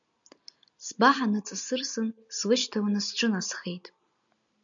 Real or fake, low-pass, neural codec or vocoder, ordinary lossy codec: real; 7.2 kHz; none; MP3, 96 kbps